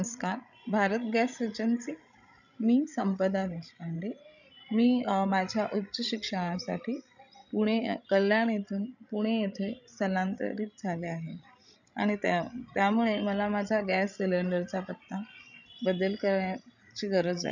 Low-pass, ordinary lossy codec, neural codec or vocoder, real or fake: 7.2 kHz; none; codec, 16 kHz, 16 kbps, FreqCodec, larger model; fake